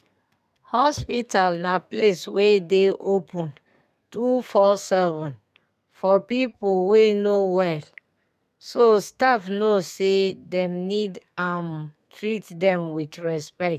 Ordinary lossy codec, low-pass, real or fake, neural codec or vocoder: none; 14.4 kHz; fake; codec, 32 kHz, 1.9 kbps, SNAC